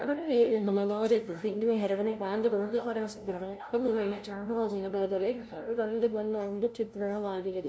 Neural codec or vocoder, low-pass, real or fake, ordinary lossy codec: codec, 16 kHz, 0.5 kbps, FunCodec, trained on LibriTTS, 25 frames a second; none; fake; none